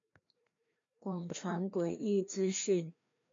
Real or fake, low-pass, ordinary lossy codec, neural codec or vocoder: fake; 7.2 kHz; AAC, 48 kbps; codec, 16 kHz, 1 kbps, FreqCodec, larger model